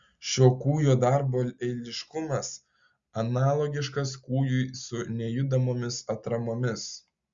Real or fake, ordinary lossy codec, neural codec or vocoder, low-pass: real; Opus, 64 kbps; none; 7.2 kHz